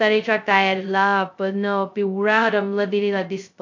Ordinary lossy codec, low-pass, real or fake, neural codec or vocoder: AAC, 48 kbps; 7.2 kHz; fake; codec, 16 kHz, 0.2 kbps, FocalCodec